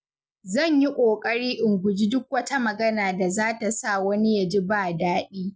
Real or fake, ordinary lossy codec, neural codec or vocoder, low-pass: real; none; none; none